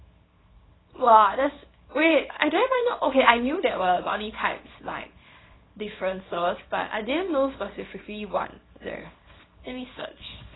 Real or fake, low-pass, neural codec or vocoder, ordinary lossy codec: fake; 7.2 kHz; codec, 24 kHz, 0.9 kbps, WavTokenizer, small release; AAC, 16 kbps